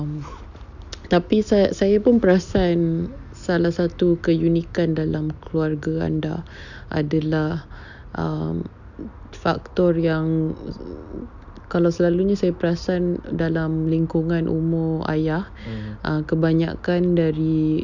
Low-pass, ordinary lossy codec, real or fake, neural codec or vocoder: 7.2 kHz; none; real; none